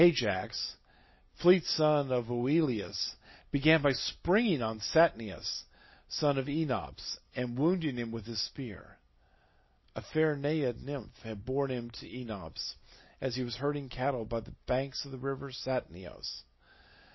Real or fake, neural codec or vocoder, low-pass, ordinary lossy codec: real; none; 7.2 kHz; MP3, 24 kbps